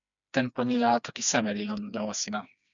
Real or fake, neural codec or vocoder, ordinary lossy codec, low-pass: fake; codec, 16 kHz, 2 kbps, FreqCodec, smaller model; MP3, 96 kbps; 7.2 kHz